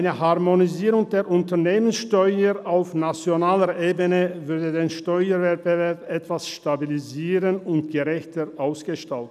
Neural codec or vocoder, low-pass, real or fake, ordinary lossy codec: none; 14.4 kHz; real; none